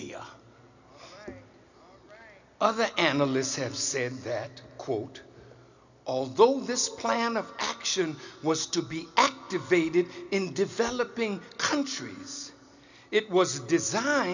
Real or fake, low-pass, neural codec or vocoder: real; 7.2 kHz; none